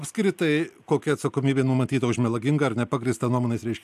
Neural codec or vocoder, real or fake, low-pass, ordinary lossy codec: none; real; 14.4 kHz; AAC, 96 kbps